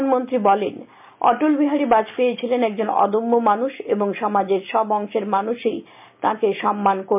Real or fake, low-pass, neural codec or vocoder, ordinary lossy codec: real; 3.6 kHz; none; MP3, 32 kbps